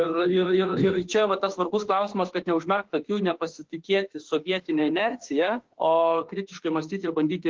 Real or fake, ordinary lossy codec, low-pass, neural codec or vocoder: fake; Opus, 16 kbps; 7.2 kHz; autoencoder, 48 kHz, 32 numbers a frame, DAC-VAE, trained on Japanese speech